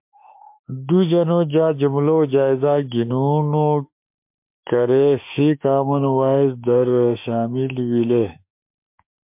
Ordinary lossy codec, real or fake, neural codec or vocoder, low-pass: MP3, 32 kbps; fake; autoencoder, 48 kHz, 32 numbers a frame, DAC-VAE, trained on Japanese speech; 3.6 kHz